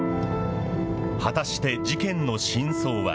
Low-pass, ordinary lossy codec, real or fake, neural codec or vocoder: none; none; real; none